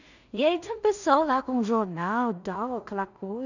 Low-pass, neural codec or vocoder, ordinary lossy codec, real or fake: 7.2 kHz; codec, 16 kHz in and 24 kHz out, 0.4 kbps, LongCat-Audio-Codec, two codebook decoder; none; fake